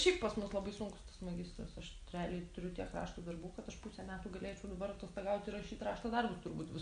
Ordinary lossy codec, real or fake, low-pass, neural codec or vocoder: MP3, 48 kbps; real; 9.9 kHz; none